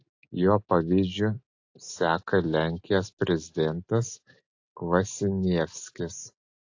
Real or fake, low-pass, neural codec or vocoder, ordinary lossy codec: real; 7.2 kHz; none; AAC, 48 kbps